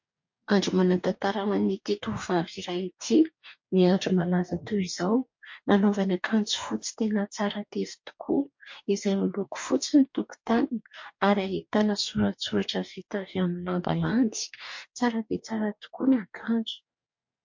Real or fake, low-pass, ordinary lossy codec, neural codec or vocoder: fake; 7.2 kHz; MP3, 48 kbps; codec, 44.1 kHz, 2.6 kbps, DAC